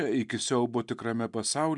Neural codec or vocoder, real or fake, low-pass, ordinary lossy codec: none; real; 10.8 kHz; MP3, 96 kbps